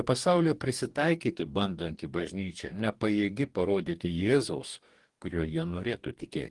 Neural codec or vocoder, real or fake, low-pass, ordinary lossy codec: codec, 44.1 kHz, 2.6 kbps, DAC; fake; 10.8 kHz; Opus, 24 kbps